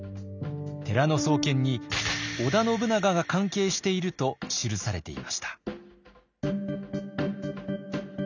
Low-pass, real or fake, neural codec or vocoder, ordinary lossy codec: 7.2 kHz; real; none; none